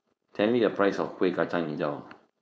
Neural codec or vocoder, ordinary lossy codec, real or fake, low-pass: codec, 16 kHz, 4.8 kbps, FACodec; none; fake; none